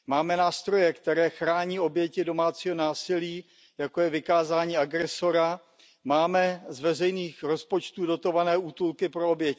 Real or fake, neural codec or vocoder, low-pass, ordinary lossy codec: real; none; none; none